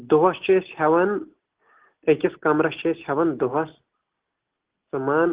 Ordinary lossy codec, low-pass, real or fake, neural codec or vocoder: Opus, 16 kbps; 3.6 kHz; real; none